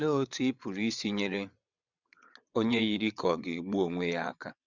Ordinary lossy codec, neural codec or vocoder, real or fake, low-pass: none; vocoder, 22.05 kHz, 80 mel bands, WaveNeXt; fake; 7.2 kHz